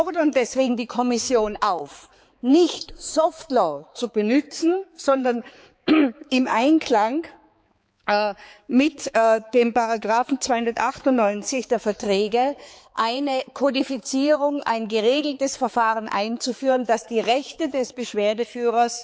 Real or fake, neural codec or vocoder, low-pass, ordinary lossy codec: fake; codec, 16 kHz, 4 kbps, X-Codec, HuBERT features, trained on balanced general audio; none; none